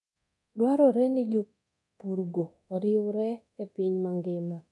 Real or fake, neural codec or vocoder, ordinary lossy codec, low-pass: fake; codec, 24 kHz, 0.9 kbps, DualCodec; none; 10.8 kHz